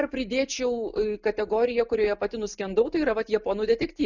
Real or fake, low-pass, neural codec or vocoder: real; 7.2 kHz; none